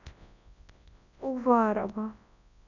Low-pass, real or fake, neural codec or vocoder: 7.2 kHz; fake; codec, 24 kHz, 0.9 kbps, WavTokenizer, large speech release